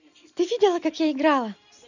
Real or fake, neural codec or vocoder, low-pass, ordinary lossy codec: real; none; 7.2 kHz; none